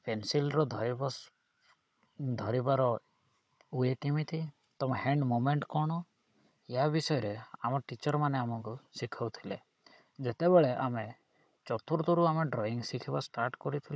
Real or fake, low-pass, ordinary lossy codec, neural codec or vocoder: fake; none; none; codec, 16 kHz, 16 kbps, FunCodec, trained on Chinese and English, 50 frames a second